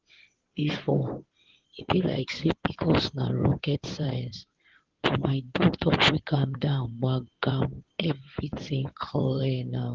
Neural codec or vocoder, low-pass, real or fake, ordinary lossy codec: codec, 16 kHz in and 24 kHz out, 2.2 kbps, FireRedTTS-2 codec; 7.2 kHz; fake; Opus, 16 kbps